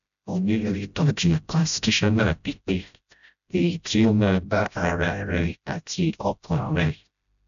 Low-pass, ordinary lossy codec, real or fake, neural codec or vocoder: 7.2 kHz; none; fake; codec, 16 kHz, 0.5 kbps, FreqCodec, smaller model